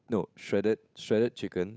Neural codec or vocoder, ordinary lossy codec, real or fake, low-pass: codec, 16 kHz, 8 kbps, FunCodec, trained on Chinese and English, 25 frames a second; none; fake; none